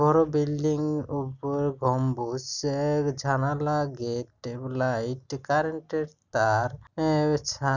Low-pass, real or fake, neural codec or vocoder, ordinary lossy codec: 7.2 kHz; real; none; none